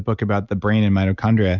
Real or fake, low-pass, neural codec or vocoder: real; 7.2 kHz; none